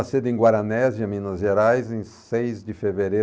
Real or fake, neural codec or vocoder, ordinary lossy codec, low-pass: real; none; none; none